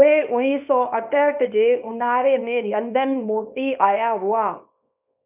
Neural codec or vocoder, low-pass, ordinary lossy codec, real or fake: codec, 24 kHz, 0.9 kbps, WavTokenizer, small release; 3.6 kHz; none; fake